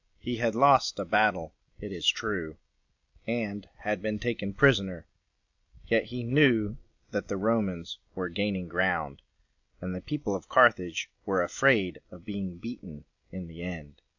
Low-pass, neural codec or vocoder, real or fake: 7.2 kHz; none; real